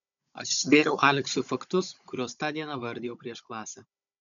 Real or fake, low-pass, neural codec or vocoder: fake; 7.2 kHz; codec, 16 kHz, 16 kbps, FunCodec, trained on Chinese and English, 50 frames a second